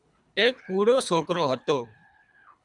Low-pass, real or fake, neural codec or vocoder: 10.8 kHz; fake; codec, 24 kHz, 3 kbps, HILCodec